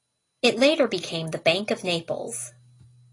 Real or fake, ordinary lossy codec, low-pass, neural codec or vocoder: real; AAC, 32 kbps; 10.8 kHz; none